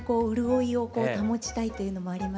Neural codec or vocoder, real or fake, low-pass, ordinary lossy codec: none; real; none; none